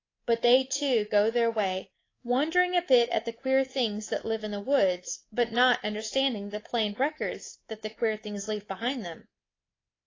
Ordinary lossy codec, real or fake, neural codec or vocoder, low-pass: AAC, 32 kbps; fake; codec, 24 kHz, 3.1 kbps, DualCodec; 7.2 kHz